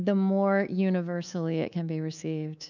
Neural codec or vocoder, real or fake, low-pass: codec, 24 kHz, 3.1 kbps, DualCodec; fake; 7.2 kHz